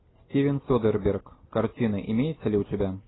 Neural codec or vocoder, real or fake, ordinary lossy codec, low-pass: none; real; AAC, 16 kbps; 7.2 kHz